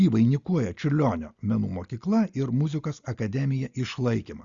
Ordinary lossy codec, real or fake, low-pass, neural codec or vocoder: Opus, 64 kbps; real; 7.2 kHz; none